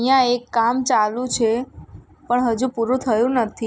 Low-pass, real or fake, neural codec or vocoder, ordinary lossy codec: none; real; none; none